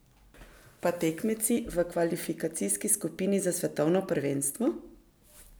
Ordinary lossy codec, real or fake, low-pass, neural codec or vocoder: none; real; none; none